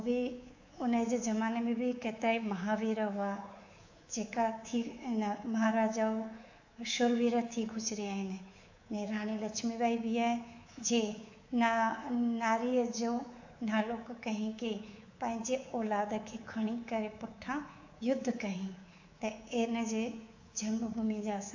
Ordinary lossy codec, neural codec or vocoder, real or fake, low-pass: AAC, 48 kbps; codec, 24 kHz, 3.1 kbps, DualCodec; fake; 7.2 kHz